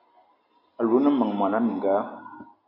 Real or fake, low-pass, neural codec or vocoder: real; 5.4 kHz; none